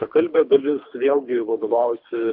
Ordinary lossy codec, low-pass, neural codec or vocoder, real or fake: AAC, 48 kbps; 5.4 kHz; codec, 24 kHz, 3 kbps, HILCodec; fake